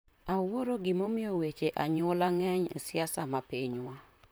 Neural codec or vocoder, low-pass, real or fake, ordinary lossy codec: vocoder, 44.1 kHz, 128 mel bands, Pupu-Vocoder; none; fake; none